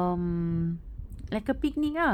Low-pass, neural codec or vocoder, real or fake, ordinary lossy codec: 19.8 kHz; none; real; none